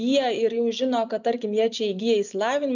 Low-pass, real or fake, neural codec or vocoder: 7.2 kHz; real; none